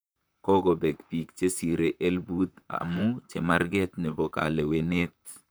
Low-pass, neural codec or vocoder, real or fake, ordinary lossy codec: none; vocoder, 44.1 kHz, 128 mel bands, Pupu-Vocoder; fake; none